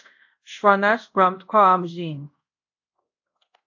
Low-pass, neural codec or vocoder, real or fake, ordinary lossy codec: 7.2 kHz; codec, 24 kHz, 0.5 kbps, DualCodec; fake; AAC, 48 kbps